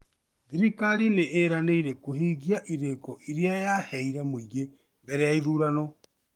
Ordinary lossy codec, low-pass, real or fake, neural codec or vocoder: Opus, 32 kbps; 19.8 kHz; fake; codec, 44.1 kHz, 7.8 kbps, Pupu-Codec